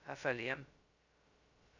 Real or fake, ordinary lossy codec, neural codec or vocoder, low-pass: fake; Opus, 64 kbps; codec, 16 kHz, 0.2 kbps, FocalCodec; 7.2 kHz